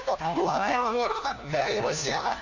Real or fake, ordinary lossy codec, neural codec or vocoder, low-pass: fake; none; codec, 16 kHz, 1 kbps, FunCodec, trained on LibriTTS, 50 frames a second; 7.2 kHz